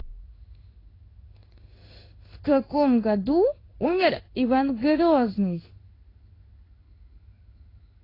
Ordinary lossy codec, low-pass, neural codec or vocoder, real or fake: AAC, 24 kbps; 5.4 kHz; codec, 16 kHz in and 24 kHz out, 1 kbps, XY-Tokenizer; fake